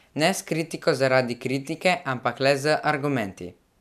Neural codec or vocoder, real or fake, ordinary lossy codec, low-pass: none; real; none; 14.4 kHz